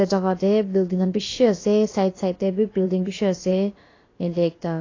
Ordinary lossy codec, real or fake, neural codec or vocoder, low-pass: AAC, 32 kbps; fake; codec, 16 kHz, about 1 kbps, DyCAST, with the encoder's durations; 7.2 kHz